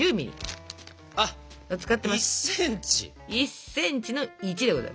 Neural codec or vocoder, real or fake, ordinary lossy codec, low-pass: none; real; none; none